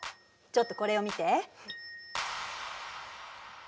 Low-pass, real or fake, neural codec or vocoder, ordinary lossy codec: none; real; none; none